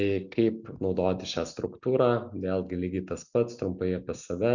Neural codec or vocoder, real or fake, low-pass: none; real; 7.2 kHz